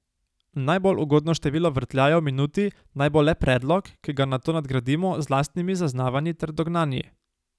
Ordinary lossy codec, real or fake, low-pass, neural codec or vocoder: none; real; none; none